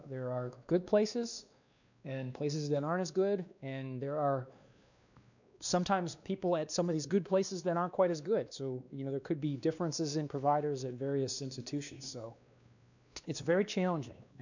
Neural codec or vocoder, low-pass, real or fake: codec, 16 kHz, 2 kbps, X-Codec, WavLM features, trained on Multilingual LibriSpeech; 7.2 kHz; fake